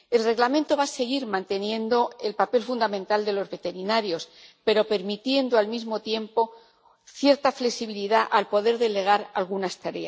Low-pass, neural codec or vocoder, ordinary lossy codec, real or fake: none; none; none; real